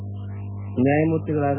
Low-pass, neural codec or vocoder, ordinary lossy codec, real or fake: 3.6 kHz; none; none; real